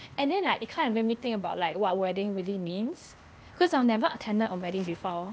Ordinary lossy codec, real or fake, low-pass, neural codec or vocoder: none; fake; none; codec, 16 kHz, 0.8 kbps, ZipCodec